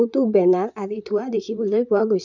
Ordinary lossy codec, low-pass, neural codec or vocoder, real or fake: none; 7.2 kHz; vocoder, 44.1 kHz, 128 mel bands, Pupu-Vocoder; fake